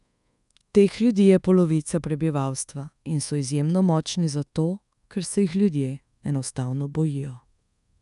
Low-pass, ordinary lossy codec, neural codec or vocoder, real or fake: 10.8 kHz; none; codec, 24 kHz, 1.2 kbps, DualCodec; fake